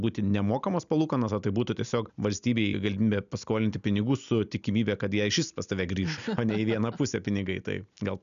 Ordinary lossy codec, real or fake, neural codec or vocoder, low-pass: MP3, 96 kbps; real; none; 7.2 kHz